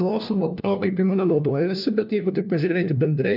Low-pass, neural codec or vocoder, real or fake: 5.4 kHz; codec, 16 kHz, 1 kbps, FunCodec, trained on LibriTTS, 50 frames a second; fake